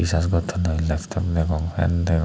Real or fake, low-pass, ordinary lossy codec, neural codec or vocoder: real; none; none; none